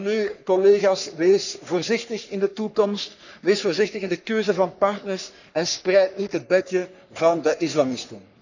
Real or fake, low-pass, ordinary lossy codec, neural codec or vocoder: fake; 7.2 kHz; none; codec, 44.1 kHz, 3.4 kbps, Pupu-Codec